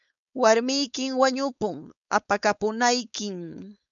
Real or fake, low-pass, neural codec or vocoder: fake; 7.2 kHz; codec, 16 kHz, 4.8 kbps, FACodec